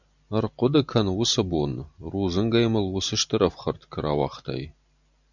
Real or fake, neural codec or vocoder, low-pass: real; none; 7.2 kHz